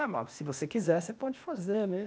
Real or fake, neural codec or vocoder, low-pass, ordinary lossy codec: fake; codec, 16 kHz, 0.8 kbps, ZipCodec; none; none